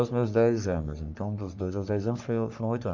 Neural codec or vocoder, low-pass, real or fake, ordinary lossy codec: codec, 44.1 kHz, 3.4 kbps, Pupu-Codec; 7.2 kHz; fake; none